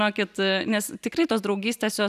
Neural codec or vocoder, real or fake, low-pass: none; real; 14.4 kHz